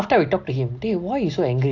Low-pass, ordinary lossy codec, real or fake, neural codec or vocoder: 7.2 kHz; AAC, 48 kbps; real; none